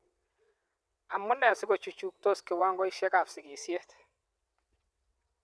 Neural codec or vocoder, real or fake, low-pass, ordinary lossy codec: vocoder, 22.05 kHz, 80 mel bands, WaveNeXt; fake; none; none